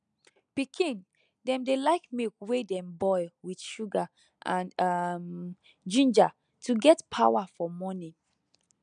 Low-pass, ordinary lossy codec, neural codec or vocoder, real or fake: 9.9 kHz; none; none; real